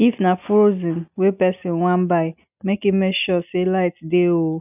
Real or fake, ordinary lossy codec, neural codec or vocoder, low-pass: real; none; none; 3.6 kHz